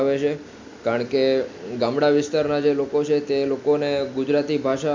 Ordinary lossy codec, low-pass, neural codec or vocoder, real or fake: MP3, 48 kbps; 7.2 kHz; none; real